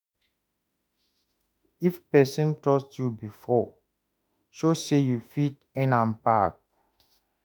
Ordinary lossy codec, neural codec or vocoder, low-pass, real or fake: none; autoencoder, 48 kHz, 32 numbers a frame, DAC-VAE, trained on Japanese speech; none; fake